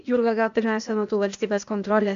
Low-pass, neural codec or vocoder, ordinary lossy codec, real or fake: 7.2 kHz; codec, 16 kHz, 0.8 kbps, ZipCodec; MP3, 64 kbps; fake